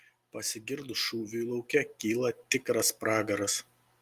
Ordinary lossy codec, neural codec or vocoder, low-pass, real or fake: Opus, 32 kbps; none; 14.4 kHz; real